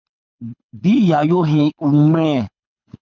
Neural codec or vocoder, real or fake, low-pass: codec, 24 kHz, 6 kbps, HILCodec; fake; 7.2 kHz